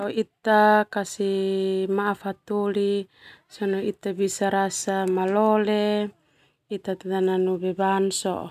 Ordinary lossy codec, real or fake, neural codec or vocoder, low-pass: none; real; none; 14.4 kHz